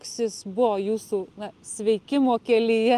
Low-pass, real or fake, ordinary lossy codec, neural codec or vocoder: 14.4 kHz; real; Opus, 32 kbps; none